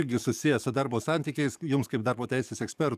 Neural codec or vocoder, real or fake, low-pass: codec, 44.1 kHz, 7.8 kbps, DAC; fake; 14.4 kHz